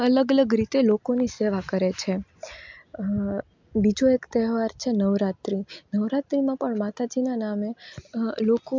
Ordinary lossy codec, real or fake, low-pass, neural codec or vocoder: MP3, 64 kbps; real; 7.2 kHz; none